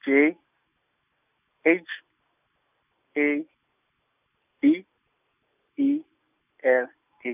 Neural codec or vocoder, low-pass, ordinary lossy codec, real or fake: none; 3.6 kHz; none; real